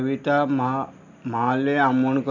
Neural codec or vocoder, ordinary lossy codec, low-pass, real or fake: none; none; 7.2 kHz; real